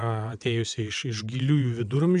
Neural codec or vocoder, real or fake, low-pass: vocoder, 22.05 kHz, 80 mel bands, WaveNeXt; fake; 9.9 kHz